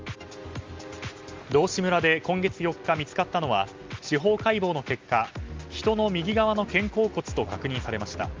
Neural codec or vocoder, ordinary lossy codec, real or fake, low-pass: none; Opus, 32 kbps; real; 7.2 kHz